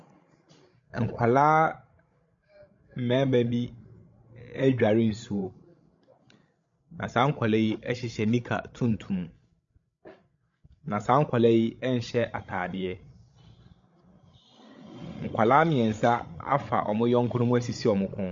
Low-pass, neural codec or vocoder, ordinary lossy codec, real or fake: 7.2 kHz; codec, 16 kHz, 16 kbps, FreqCodec, larger model; MP3, 48 kbps; fake